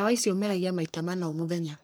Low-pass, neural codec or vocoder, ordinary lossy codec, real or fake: none; codec, 44.1 kHz, 3.4 kbps, Pupu-Codec; none; fake